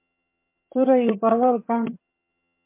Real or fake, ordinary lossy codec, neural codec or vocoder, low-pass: fake; MP3, 24 kbps; vocoder, 22.05 kHz, 80 mel bands, HiFi-GAN; 3.6 kHz